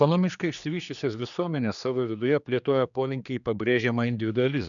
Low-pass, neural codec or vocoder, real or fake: 7.2 kHz; codec, 16 kHz, 2 kbps, X-Codec, HuBERT features, trained on general audio; fake